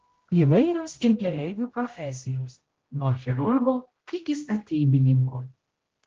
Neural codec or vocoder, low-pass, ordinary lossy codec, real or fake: codec, 16 kHz, 0.5 kbps, X-Codec, HuBERT features, trained on general audio; 7.2 kHz; Opus, 16 kbps; fake